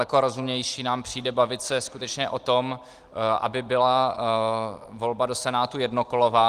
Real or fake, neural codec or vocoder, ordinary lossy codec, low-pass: real; none; Opus, 24 kbps; 14.4 kHz